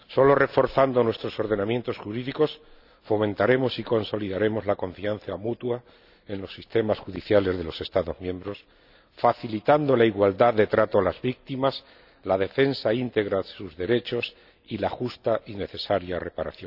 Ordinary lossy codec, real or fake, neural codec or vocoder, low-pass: none; real; none; 5.4 kHz